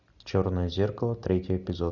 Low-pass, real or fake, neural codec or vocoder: 7.2 kHz; real; none